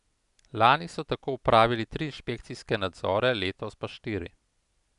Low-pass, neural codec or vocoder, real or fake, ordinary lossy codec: 10.8 kHz; none; real; none